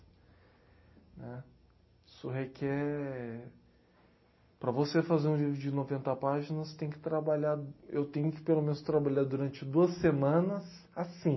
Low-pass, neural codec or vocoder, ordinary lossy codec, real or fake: 7.2 kHz; none; MP3, 24 kbps; real